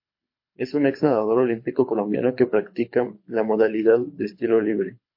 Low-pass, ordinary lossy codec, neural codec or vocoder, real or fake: 5.4 kHz; MP3, 32 kbps; codec, 24 kHz, 6 kbps, HILCodec; fake